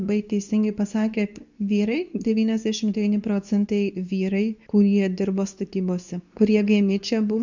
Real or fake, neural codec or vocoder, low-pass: fake; codec, 24 kHz, 0.9 kbps, WavTokenizer, medium speech release version 2; 7.2 kHz